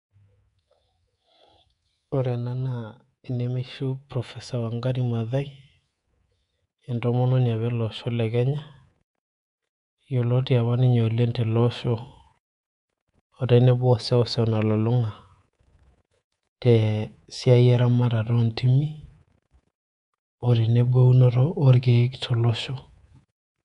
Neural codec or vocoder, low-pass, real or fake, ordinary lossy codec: codec, 24 kHz, 3.1 kbps, DualCodec; 10.8 kHz; fake; none